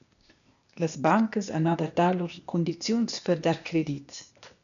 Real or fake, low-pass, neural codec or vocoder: fake; 7.2 kHz; codec, 16 kHz, 0.8 kbps, ZipCodec